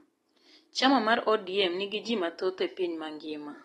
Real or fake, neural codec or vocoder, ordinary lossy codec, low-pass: real; none; AAC, 32 kbps; 19.8 kHz